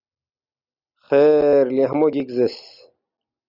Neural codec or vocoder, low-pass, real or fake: none; 5.4 kHz; real